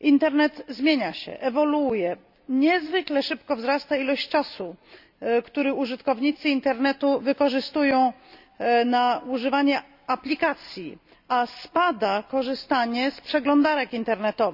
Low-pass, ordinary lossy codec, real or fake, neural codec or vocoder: 5.4 kHz; none; real; none